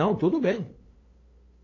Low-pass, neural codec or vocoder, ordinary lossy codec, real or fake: 7.2 kHz; codec, 16 kHz, 8 kbps, FunCodec, trained on LibriTTS, 25 frames a second; AAC, 32 kbps; fake